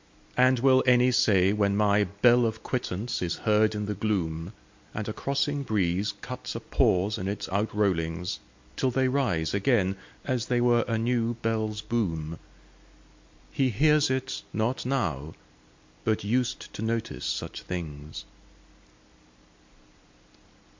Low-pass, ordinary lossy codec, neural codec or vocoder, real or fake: 7.2 kHz; MP3, 48 kbps; none; real